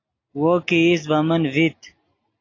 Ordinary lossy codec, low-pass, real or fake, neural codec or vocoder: AAC, 32 kbps; 7.2 kHz; real; none